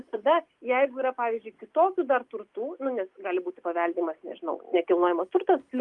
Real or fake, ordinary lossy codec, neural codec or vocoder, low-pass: real; Opus, 32 kbps; none; 10.8 kHz